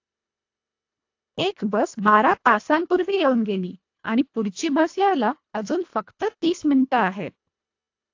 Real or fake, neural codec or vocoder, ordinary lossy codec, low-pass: fake; codec, 24 kHz, 1.5 kbps, HILCodec; AAC, 48 kbps; 7.2 kHz